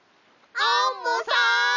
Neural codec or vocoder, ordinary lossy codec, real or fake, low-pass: none; none; real; 7.2 kHz